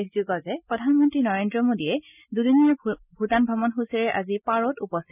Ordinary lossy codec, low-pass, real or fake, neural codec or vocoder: none; 3.6 kHz; real; none